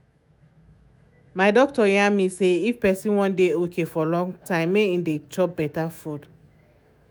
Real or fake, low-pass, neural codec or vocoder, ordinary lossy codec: fake; none; autoencoder, 48 kHz, 128 numbers a frame, DAC-VAE, trained on Japanese speech; none